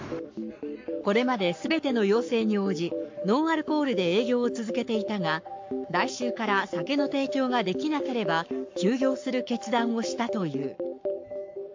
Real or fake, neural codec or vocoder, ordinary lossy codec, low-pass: fake; codec, 44.1 kHz, 7.8 kbps, Pupu-Codec; MP3, 48 kbps; 7.2 kHz